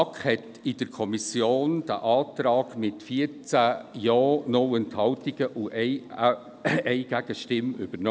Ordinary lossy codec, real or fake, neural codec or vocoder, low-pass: none; real; none; none